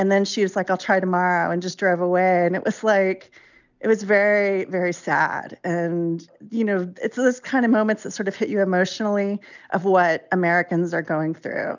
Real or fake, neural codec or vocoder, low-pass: real; none; 7.2 kHz